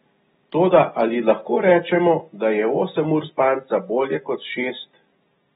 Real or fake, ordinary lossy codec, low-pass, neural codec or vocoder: real; AAC, 16 kbps; 9.9 kHz; none